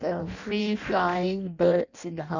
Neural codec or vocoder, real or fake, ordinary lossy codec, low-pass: codec, 16 kHz in and 24 kHz out, 0.6 kbps, FireRedTTS-2 codec; fake; none; 7.2 kHz